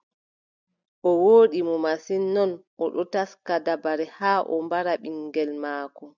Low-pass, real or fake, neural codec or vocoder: 7.2 kHz; real; none